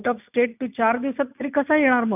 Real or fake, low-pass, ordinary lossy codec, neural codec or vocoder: real; 3.6 kHz; none; none